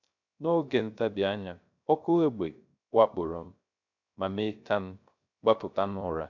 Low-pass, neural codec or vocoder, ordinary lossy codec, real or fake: 7.2 kHz; codec, 16 kHz, 0.3 kbps, FocalCodec; none; fake